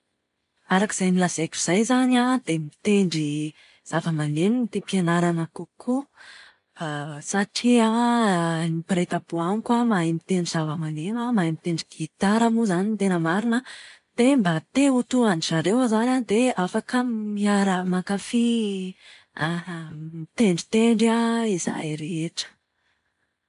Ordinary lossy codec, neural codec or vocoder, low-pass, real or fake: none; none; 10.8 kHz; real